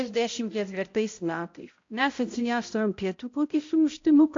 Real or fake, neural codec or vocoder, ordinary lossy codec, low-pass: fake; codec, 16 kHz, 0.5 kbps, X-Codec, HuBERT features, trained on balanced general audio; AAC, 48 kbps; 7.2 kHz